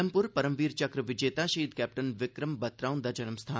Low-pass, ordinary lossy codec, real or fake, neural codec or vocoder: none; none; real; none